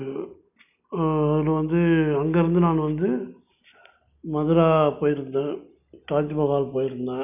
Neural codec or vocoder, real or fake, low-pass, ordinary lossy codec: none; real; 3.6 kHz; none